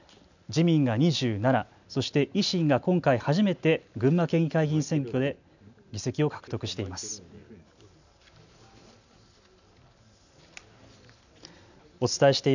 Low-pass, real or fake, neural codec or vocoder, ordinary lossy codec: 7.2 kHz; real; none; none